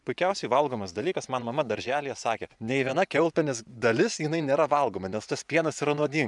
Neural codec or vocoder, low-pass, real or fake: vocoder, 24 kHz, 100 mel bands, Vocos; 10.8 kHz; fake